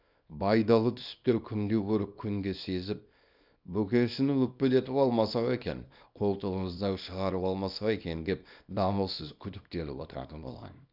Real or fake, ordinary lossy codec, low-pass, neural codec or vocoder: fake; none; 5.4 kHz; codec, 24 kHz, 0.9 kbps, WavTokenizer, small release